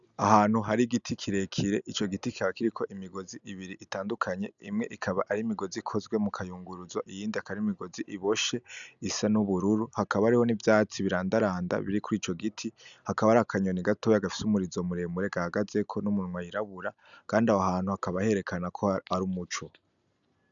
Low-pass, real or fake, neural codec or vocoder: 7.2 kHz; real; none